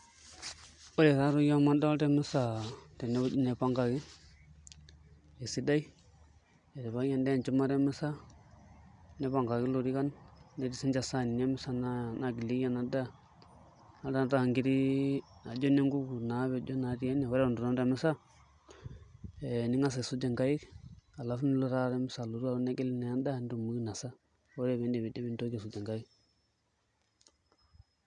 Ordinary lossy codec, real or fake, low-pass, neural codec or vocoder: none; real; 9.9 kHz; none